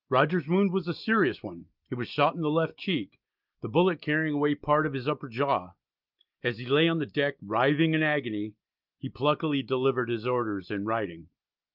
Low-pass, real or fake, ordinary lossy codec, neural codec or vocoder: 5.4 kHz; real; Opus, 24 kbps; none